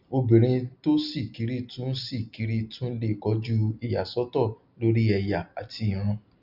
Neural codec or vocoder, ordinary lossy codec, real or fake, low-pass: none; none; real; 5.4 kHz